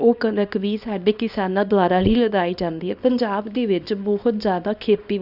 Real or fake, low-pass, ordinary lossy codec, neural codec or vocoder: fake; 5.4 kHz; none; codec, 24 kHz, 0.9 kbps, WavTokenizer, small release